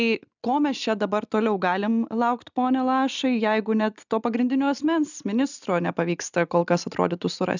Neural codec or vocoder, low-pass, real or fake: none; 7.2 kHz; real